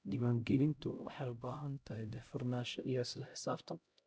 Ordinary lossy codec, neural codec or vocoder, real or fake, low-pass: none; codec, 16 kHz, 0.5 kbps, X-Codec, HuBERT features, trained on LibriSpeech; fake; none